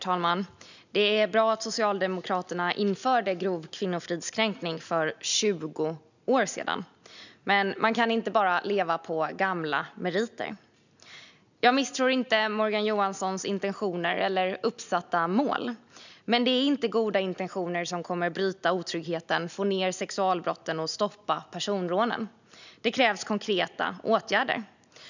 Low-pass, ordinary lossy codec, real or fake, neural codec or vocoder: 7.2 kHz; none; real; none